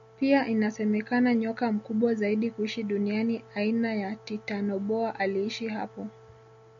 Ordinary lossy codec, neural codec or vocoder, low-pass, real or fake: MP3, 64 kbps; none; 7.2 kHz; real